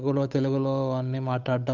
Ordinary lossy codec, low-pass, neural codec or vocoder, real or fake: none; 7.2 kHz; codec, 16 kHz, 8 kbps, FunCodec, trained on Chinese and English, 25 frames a second; fake